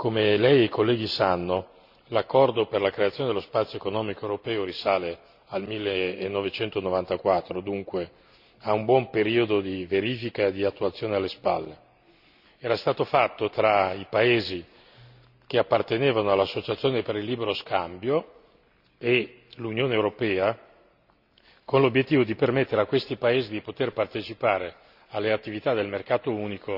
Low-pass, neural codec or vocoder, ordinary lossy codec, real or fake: 5.4 kHz; none; none; real